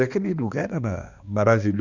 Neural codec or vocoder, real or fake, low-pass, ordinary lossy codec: codec, 16 kHz, 2 kbps, X-Codec, HuBERT features, trained on balanced general audio; fake; 7.2 kHz; none